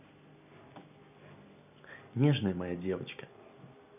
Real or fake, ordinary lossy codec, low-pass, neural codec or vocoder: real; none; 3.6 kHz; none